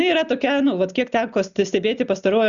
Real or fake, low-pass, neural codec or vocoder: real; 7.2 kHz; none